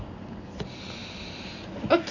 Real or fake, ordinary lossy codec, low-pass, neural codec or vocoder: real; none; 7.2 kHz; none